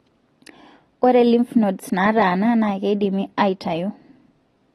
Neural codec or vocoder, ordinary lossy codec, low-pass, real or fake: none; AAC, 32 kbps; 19.8 kHz; real